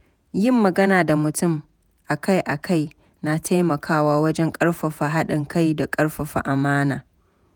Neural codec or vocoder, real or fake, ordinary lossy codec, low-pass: vocoder, 48 kHz, 128 mel bands, Vocos; fake; none; none